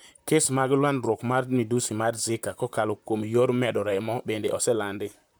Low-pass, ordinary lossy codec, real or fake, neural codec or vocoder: none; none; fake; vocoder, 44.1 kHz, 128 mel bands, Pupu-Vocoder